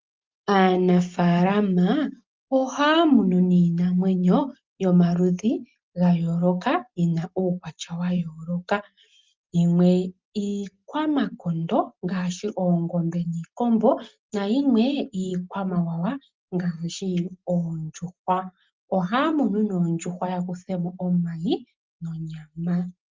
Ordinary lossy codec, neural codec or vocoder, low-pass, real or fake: Opus, 24 kbps; none; 7.2 kHz; real